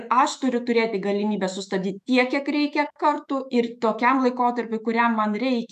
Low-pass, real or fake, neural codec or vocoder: 14.4 kHz; fake; autoencoder, 48 kHz, 128 numbers a frame, DAC-VAE, trained on Japanese speech